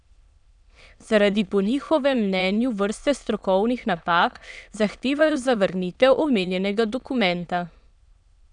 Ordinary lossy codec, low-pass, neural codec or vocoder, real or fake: none; 9.9 kHz; autoencoder, 22.05 kHz, a latent of 192 numbers a frame, VITS, trained on many speakers; fake